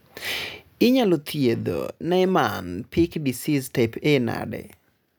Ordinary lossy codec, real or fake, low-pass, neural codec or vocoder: none; real; none; none